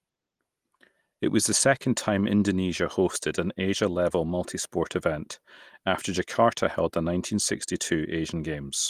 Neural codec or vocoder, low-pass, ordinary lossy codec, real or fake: none; 14.4 kHz; Opus, 24 kbps; real